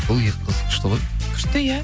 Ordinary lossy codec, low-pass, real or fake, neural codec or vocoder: none; none; real; none